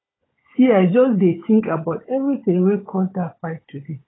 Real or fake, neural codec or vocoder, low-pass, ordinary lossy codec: fake; codec, 16 kHz, 16 kbps, FunCodec, trained on Chinese and English, 50 frames a second; 7.2 kHz; AAC, 16 kbps